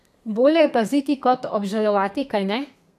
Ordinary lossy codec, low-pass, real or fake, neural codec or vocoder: none; 14.4 kHz; fake; codec, 32 kHz, 1.9 kbps, SNAC